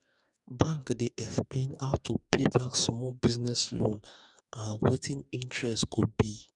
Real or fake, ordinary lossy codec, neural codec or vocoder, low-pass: fake; none; codec, 44.1 kHz, 2.6 kbps, DAC; 10.8 kHz